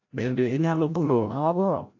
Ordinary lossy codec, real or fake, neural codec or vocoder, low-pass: AAC, 48 kbps; fake; codec, 16 kHz, 0.5 kbps, FreqCodec, larger model; 7.2 kHz